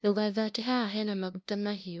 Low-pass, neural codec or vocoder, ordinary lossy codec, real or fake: none; codec, 16 kHz, 0.5 kbps, FunCodec, trained on LibriTTS, 25 frames a second; none; fake